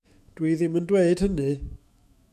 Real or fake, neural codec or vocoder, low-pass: fake; autoencoder, 48 kHz, 128 numbers a frame, DAC-VAE, trained on Japanese speech; 14.4 kHz